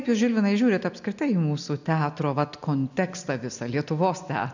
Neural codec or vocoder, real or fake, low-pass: none; real; 7.2 kHz